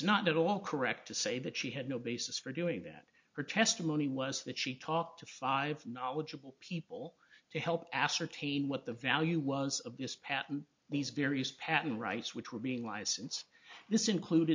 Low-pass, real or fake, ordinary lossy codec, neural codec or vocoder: 7.2 kHz; real; MP3, 48 kbps; none